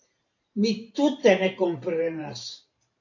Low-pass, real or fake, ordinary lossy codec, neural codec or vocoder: 7.2 kHz; fake; AAC, 48 kbps; vocoder, 44.1 kHz, 128 mel bands every 512 samples, BigVGAN v2